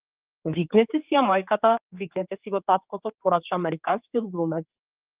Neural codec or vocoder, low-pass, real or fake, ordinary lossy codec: codec, 16 kHz, 4 kbps, X-Codec, HuBERT features, trained on general audio; 3.6 kHz; fake; Opus, 32 kbps